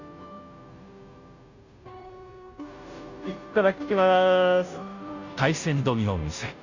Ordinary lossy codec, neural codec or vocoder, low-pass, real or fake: none; codec, 16 kHz, 0.5 kbps, FunCodec, trained on Chinese and English, 25 frames a second; 7.2 kHz; fake